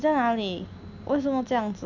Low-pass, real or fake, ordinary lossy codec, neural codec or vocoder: 7.2 kHz; real; none; none